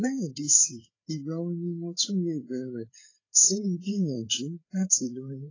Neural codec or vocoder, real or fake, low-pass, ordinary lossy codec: codec, 16 kHz, 4 kbps, FreqCodec, larger model; fake; 7.2 kHz; AAC, 32 kbps